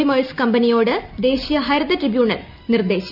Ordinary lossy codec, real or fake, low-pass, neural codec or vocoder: none; real; 5.4 kHz; none